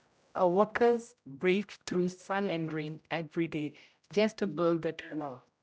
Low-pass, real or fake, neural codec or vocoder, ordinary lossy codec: none; fake; codec, 16 kHz, 0.5 kbps, X-Codec, HuBERT features, trained on general audio; none